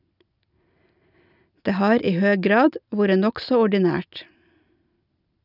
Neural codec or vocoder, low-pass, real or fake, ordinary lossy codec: none; 5.4 kHz; real; none